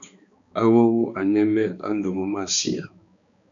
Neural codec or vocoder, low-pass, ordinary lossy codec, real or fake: codec, 16 kHz, 2 kbps, X-Codec, HuBERT features, trained on balanced general audio; 7.2 kHz; MP3, 64 kbps; fake